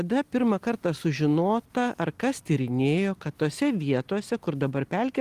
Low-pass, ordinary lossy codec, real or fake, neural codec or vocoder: 14.4 kHz; Opus, 24 kbps; real; none